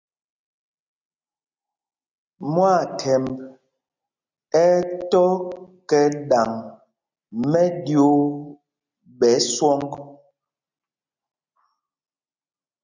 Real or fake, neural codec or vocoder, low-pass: real; none; 7.2 kHz